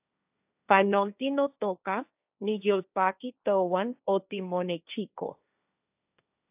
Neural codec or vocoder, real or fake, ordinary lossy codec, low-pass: codec, 16 kHz, 1.1 kbps, Voila-Tokenizer; fake; AAC, 32 kbps; 3.6 kHz